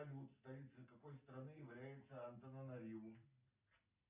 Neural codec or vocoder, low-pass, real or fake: none; 3.6 kHz; real